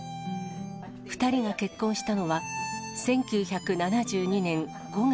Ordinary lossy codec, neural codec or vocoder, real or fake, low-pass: none; none; real; none